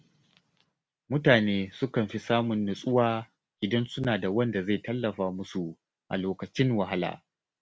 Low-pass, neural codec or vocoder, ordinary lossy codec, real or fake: none; none; none; real